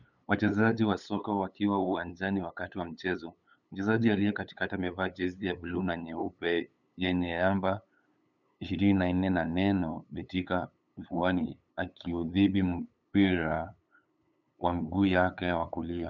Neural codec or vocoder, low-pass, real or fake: codec, 16 kHz, 8 kbps, FunCodec, trained on LibriTTS, 25 frames a second; 7.2 kHz; fake